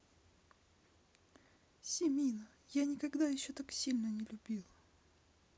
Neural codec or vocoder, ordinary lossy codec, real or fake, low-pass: none; none; real; none